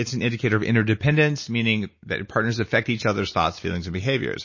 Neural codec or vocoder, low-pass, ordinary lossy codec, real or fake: none; 7.2 kHz; MP3, 32 kbps; real